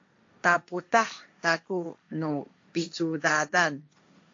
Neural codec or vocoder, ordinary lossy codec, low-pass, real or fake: codec, 16 kHz, 1.1 kbps, Voila-Tokenizer; AAC, 48 kbps; 7.2 kHz; fake